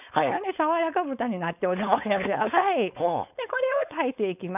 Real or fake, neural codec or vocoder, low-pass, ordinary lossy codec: fake; codec, 16 kHz, 4.8 kbps, FACodec; 3.6 kHz; none